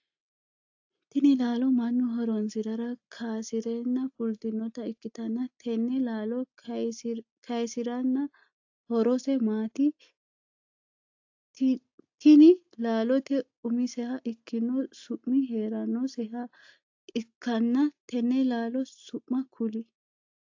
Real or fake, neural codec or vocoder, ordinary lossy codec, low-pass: real; none; AAC, 48 kbps; 7.2 kHz